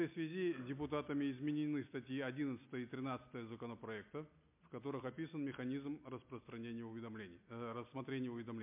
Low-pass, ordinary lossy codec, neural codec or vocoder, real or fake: 3.6 kHz; MP3, 32 kbps; none; real